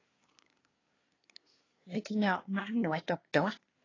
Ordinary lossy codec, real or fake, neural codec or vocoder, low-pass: AAC, 32 kbps; fake; codec, 24 kHz, 1 kbps, SNAC; 7.2 kHz